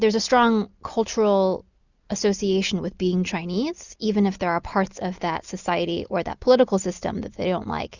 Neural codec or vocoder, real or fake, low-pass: none; real; 7.2 kHz